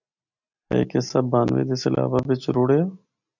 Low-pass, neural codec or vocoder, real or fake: 7.2 kHz; none; real